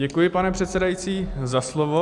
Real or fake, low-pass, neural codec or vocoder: real; 10.8 kHz; none